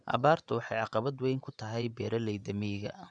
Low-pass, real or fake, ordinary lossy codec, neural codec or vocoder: 9.9 kHz; fake; none; vocoder, 24 kHz, 100 mel bands, Vocos